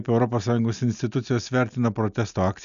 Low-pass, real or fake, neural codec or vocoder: 7.2 kHz; real; none